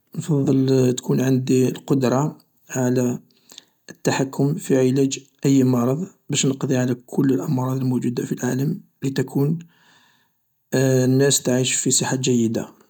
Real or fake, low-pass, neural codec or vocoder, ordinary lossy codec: real; 19.8 kHz; none; none